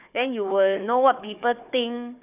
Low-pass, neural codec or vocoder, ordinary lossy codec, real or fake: 3.6 kHz; codec, 16 kHz, 4 kbps, FunCodec, trained on Chinese and English, 50 frames a second; none; fake